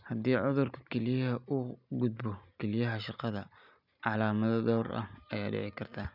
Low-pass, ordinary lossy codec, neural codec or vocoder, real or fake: 5.4 kHz; none; none; real